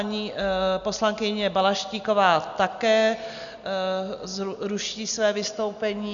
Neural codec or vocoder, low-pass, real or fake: none; 7.2 kHz; real